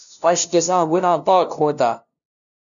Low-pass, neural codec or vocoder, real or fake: 7.2 kHz; codec, 16 kHz, 0.5 kbps, FunCodec, trained on LibriTTS, 25 frames a second; fake